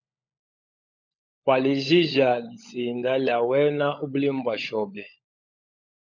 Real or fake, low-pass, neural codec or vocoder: fake; 7.2 kHz; codec, 16 kHz, 16 kbps, FunCodec, trained on LibriTTS, 50 frames a second